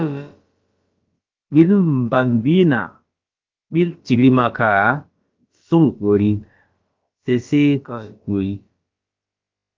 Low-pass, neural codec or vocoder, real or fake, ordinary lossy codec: 7.2 kHz; codec, 16 kHz, about 1 kbps, DyCAST, with the encoder's durations; fake; Opus, 32 kbps